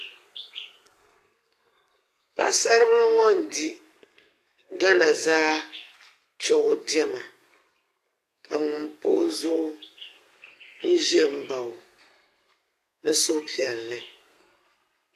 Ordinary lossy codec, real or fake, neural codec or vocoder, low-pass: AAC, 64 kbps; fake; codec, 32 kHz, 1.9 kbps, SNAC; 14.4 kHz